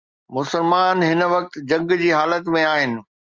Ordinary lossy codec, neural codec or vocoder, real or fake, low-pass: Opus, 32 kbps; none; real; 7.2 kHz